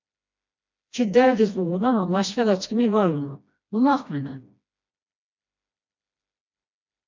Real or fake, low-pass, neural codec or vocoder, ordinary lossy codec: fake; 7.2 kHz; codec, 16 kHz, 1 kbps, FreqCodec, smaller model; MP3, 64 kbps